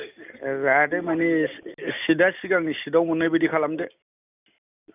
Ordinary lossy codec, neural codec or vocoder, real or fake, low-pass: none; none; real; 3.6 kHz